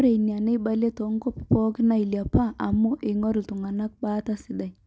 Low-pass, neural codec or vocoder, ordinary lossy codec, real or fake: none; none; none; real